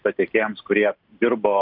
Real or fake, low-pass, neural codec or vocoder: real; 5.4 kHz; none